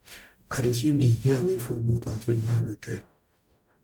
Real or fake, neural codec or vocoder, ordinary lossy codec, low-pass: fake; codec, 44.1 kHz, 0.9 kbps, DAC; none; 19.8 kHz